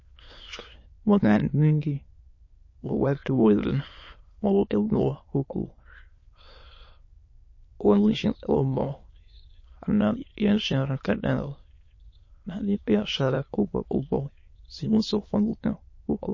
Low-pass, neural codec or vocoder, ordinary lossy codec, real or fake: 7.2 kHz; autoencoder, 22.05 kHz, a latent of 192 numbers a frame, VITS, trained on many speakers; MP3, 32 kbps; fake